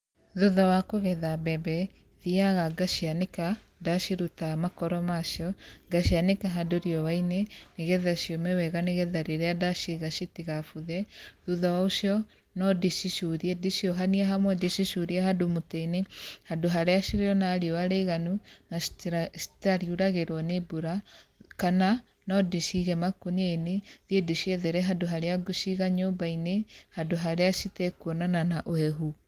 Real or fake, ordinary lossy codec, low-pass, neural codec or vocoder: real; Opus, 24 kbps; 14.4 kHz; none